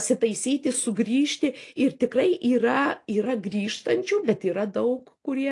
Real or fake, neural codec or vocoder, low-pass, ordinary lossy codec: real; none; 10.8 kHz; AAC, 48 kbps